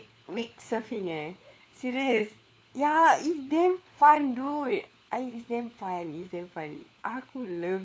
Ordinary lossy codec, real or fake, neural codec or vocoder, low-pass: none; fake; codec, 16 kHz, 4 kbps, FunCodec, trained on LibriTTS, 50 frames a second; none